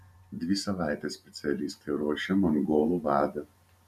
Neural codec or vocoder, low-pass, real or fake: vocoder, 44.1 kHz, 128 mel bands every 512 samples, BigVGAN v2; 14.4 kHz; fake